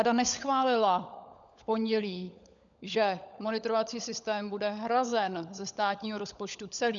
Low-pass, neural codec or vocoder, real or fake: 7.2 kHz; codec, 16 kHz, 16 kbps, FunCodec, trained on LibriTTS, 50 frames a second; fake